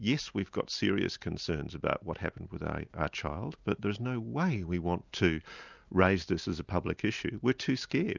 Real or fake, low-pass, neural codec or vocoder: real; 7.2 kHz; none